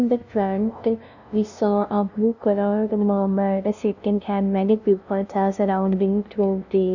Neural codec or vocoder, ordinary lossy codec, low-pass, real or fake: codec, 16 kHz, 0.5 kbps, FunCodec, trained on LibriTTS, 25 frames a second; none; 7.2 kHz; fake